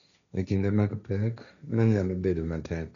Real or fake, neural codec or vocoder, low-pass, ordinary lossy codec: fake; codec, 16 kHz, 1.1 kbps, Voila-Tokenizer; 7.2 kHz; none